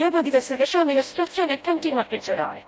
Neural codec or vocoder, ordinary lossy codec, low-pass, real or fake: codec, 16 kHz, 0.5 kbps, FreqCodec, smaller model; none; none; fake